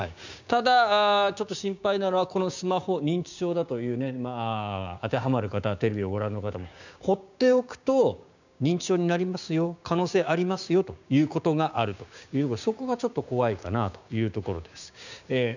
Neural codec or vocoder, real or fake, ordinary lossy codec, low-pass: codec, 16 kHz, 6 kbps, DAC; fake; none; 7.2 kHz